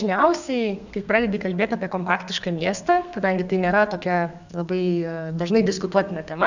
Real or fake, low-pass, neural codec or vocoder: fake; 7.2 kHz; codec, 32 kHz, 1.9 kbps, SNAC